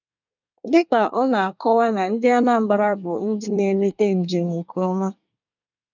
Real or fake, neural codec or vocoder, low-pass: fake; codec, 24 kHz, 1 kbps, SNAC; 7.2 kHz